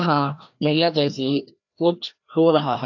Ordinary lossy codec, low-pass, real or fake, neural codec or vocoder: AAC, 48 kbps; 7.2 kHz; fake; codec, 16 kHz, 1 kbps, FreqCodec, larger model